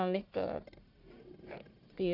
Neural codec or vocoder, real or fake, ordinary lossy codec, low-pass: codec, 44.1 kHz, 1.7 kbps, Pupu-Codec; fake; none; 5.4 kHz